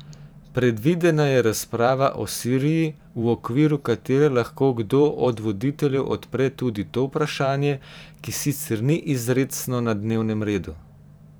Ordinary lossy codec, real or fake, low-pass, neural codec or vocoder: none; fake; none; vocoder, 44.1 kHz, 128 mel bands every 512 samples, BigVGAN v2